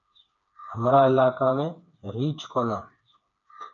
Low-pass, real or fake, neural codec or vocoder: 7.2 kHz; fake; codec, 16 kHz, 4 kbps, FreqCodec, smaller model